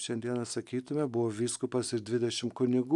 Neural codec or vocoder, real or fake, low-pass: none; real; 10.8 kHz